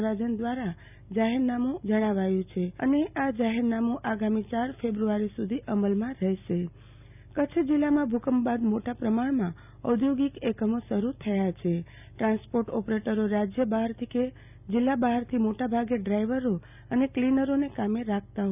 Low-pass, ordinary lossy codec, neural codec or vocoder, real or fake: 3.6 kHz; none; none; real